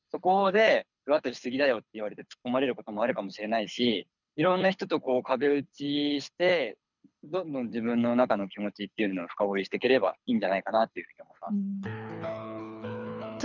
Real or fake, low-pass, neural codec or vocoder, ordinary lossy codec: fake; 7.2 kHz; codec, 24 kHz, 6 kbps, HILCodec; none